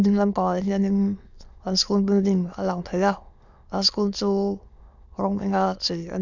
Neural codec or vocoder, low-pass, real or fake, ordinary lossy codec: autoencoder, 22.05 kHz, a latent of 192 numbers a frame, VITS, trained on many speakers; 7.2 kHz; fake; none